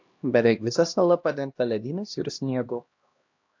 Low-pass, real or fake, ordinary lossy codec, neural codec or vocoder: 7.2 kHz; fake; AAC, 48 kbps; codec, 16 kHz, 1 kbps, X-Codec, HuBERT features, trained on LibriSpeech